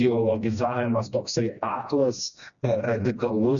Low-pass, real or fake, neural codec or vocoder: 7.2 kHz; fake; codec, 16 kHz, 1 kbps, FreqCodec, smaller model